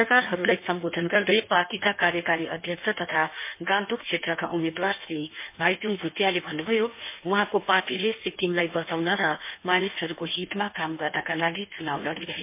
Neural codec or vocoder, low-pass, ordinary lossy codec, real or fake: codec, 16 kHz in and 24 kHz out, 1.1 kbps, FireRedTTS-2 codec; 3.6 kHz; MP3, 24 kbps; fake